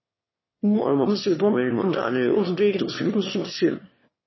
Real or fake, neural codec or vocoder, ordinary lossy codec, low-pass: fake; autoencoder, 22.05 kHz, a latent of 192 numbers a frame, VITS, trained on one speaker; MP3, 24 kbps; 7.2 kHz